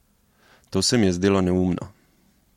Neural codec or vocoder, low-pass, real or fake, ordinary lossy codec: none; 19.8 kHz; real; MP3, 64 kbps